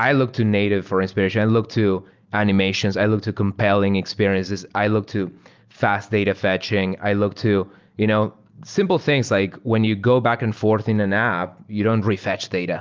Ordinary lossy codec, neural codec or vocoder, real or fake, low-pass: Opus, 32 kbps; none; real; 7.2 kHz